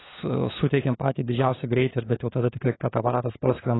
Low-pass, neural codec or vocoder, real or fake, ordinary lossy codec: 7.2 kHz; codec, 16 kHz, 4 kbps, FunCodec, trained on LibriTTS, 50 frames a second; fake; AAC, 16 kbps